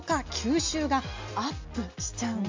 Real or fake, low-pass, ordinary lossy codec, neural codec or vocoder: real; 7.2 kHz; MP3, 64 kbps; none